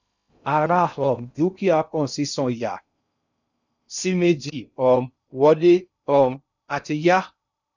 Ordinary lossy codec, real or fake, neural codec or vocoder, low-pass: none; fake; codec, 16 kHz in and 24 kHz out, 0.6 kbps, FocalCodec, streaming, 2048 codes; 7.2 kHz